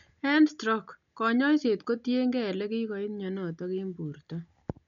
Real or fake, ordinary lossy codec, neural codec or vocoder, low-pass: real; none; none; 7.2 kHz